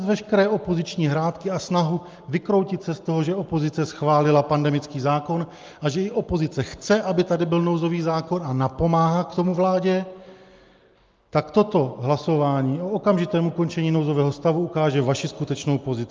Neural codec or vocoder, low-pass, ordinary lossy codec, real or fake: none; 7.2 kHz; Opus, 24 kbps; real